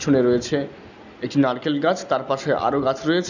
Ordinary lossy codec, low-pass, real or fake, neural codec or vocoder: none; 7.2 kHz; real; none